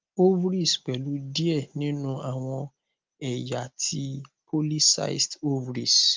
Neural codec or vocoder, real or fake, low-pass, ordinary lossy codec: none; real; 7.2 kHz; Opus, 32 kbps